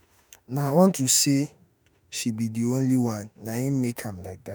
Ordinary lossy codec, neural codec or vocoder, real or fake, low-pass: none; autoencoder, 48 kHz, 32 numbers a frame, DAC-VAE, trained on Japanese speech; fake; none